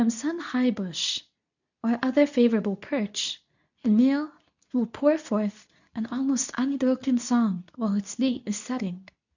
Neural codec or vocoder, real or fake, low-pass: codec, 24 kHz, 0.9 kbps, WavTokenizer, medium speech release version 2; fake; 7.2 kHz